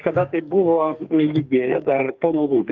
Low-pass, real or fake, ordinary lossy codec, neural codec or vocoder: 7.2 kHz; fake; Opus, 24 kbps; codec, 44.1 kHz, 2.6 kbps, SNAC